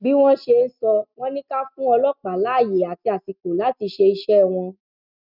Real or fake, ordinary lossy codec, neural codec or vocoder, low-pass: real; none; none; 5.4 kHz